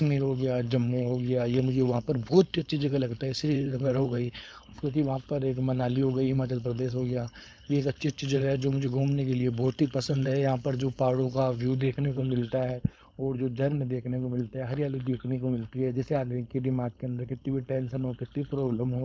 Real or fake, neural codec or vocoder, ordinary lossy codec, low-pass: fake; codec, 16 kHz, 4.8 kbps, FACodec; none; none